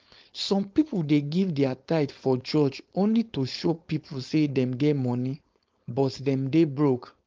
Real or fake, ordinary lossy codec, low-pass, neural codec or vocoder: fake; Opus, 24 kbps; 7.2 kHz; codec, 16 kHz, 4.8 kbps, FACodec